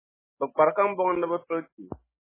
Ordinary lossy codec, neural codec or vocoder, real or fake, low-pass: MP3, 16 kbps; none; real; 3.6 kHz